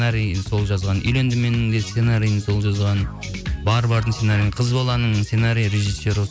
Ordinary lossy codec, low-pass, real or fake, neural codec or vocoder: none; none; real; none